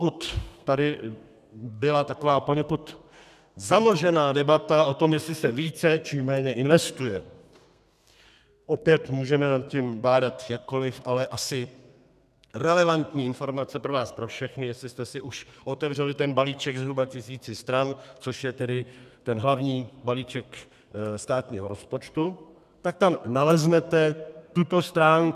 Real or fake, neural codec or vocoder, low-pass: fake; codec, 32 kHz, 1.9 kbps, SNAC; 14.4 kHz